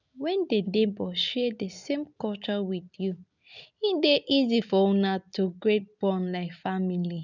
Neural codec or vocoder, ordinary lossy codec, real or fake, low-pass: none; none; real; 7.2 kHz